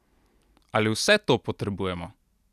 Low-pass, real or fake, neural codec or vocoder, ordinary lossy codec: 14.4 kHz; real; none; none